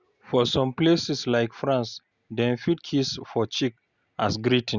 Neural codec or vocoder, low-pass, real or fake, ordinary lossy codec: none; 7.2 kHz; real; Opus, 64 kbps